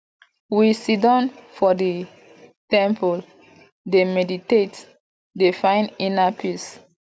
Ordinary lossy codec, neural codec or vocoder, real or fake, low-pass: none; none; real; none